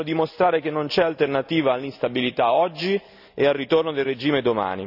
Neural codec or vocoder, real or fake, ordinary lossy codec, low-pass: none; real; none; 5.4 kHz